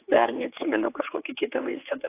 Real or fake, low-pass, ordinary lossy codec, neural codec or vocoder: fake; 3.6 kHz; AAC, 16 kbps; codec, 16 kHz, 4 kbps, X-Codec, HuBERT features, trained on general audio